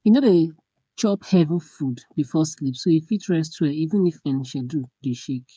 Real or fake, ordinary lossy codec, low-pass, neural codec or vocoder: fake; none; none; codec, 16 kHz, 8 kbps, FreqCodec, smaller model